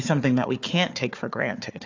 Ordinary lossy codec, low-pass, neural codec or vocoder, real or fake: AAC, 48 kbps; 7.2 kHz; codec, 16 kHz, 4 kbps, FunCodec, trained on Chinese and English, 50 frames a second; fake